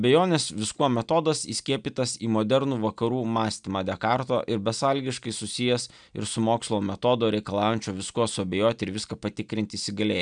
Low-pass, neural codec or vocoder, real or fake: 9.9 kHz; none; real